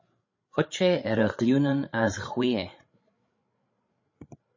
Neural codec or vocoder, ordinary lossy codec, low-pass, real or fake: codec, 16 kHz, 16 kbps, FreqCodec, larger model; MP3, 32 kbps; 7.2 kHz; fake